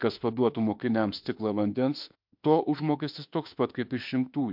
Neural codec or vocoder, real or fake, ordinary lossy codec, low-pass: autoencoder, 48 kHz, 32 numbers a frame, DAC-VAE, trained on Japanese speech; fake; MP3, 48 kbps; 5.4 kHz